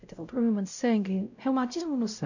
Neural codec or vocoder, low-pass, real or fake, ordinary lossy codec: codec, 16 kHz, 0.5 kbps, X-Codec, WavLM features, trained on Multilingual LibriSpeech; 7.2 kHz; fake; none